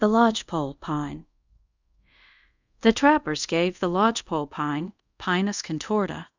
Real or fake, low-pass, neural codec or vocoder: fake; 7.2 kHz; codec, 24 kHz, 0.5 kbps, DualCodec